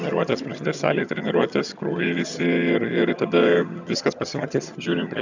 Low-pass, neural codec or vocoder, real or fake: 7.2 kHz; vocoder, 22.05 kHz, 80 mel bands, HiFi-GAN; fake